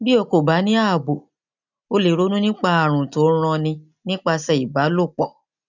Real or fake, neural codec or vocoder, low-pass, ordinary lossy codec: real; none; 7.2 kHz; none